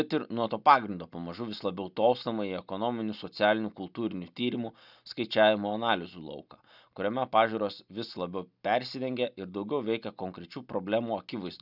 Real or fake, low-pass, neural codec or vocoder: real; 5.4 kHz; none